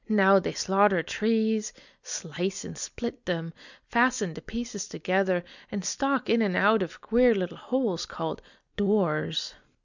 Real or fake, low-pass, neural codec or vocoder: real; 7.2 kHz; none